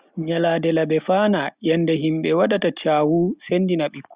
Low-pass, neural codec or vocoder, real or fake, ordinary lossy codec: 3.6 kHz; none; real; Opus, 64 kbps